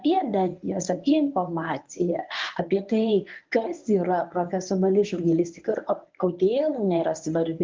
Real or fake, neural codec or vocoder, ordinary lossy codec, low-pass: fake; codec, 24 kHz, 0.9 kbps, WavTokenizer, medium speech release version 1; Opus, 32 kbps; 7.2 kHz